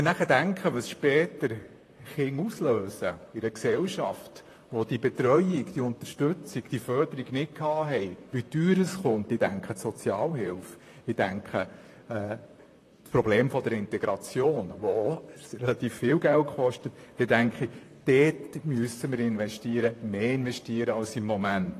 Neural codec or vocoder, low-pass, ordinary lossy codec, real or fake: vocoder, 44.1 kHz, 128 mel bands, Pupu-Vocoder; 14.4 kHz; AAC, 48 kbps; fake